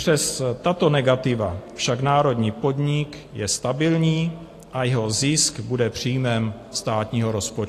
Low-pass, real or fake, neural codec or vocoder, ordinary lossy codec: 14.4 kHz; real; none; AAC, 48 kbps